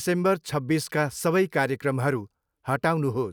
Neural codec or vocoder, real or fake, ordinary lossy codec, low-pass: none; real; none; none